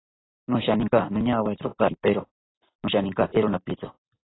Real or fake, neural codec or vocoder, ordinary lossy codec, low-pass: real; none; AAC, 16 kbps; 7.2 kHz